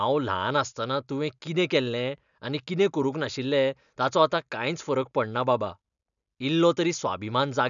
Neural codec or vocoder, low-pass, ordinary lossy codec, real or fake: none; 7.2 kHz; none; real